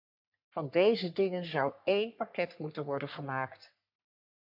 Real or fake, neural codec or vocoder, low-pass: fake; codec, 44.1 kHz, 3.4 kbps, Pupu-Codec; 5.4 kHz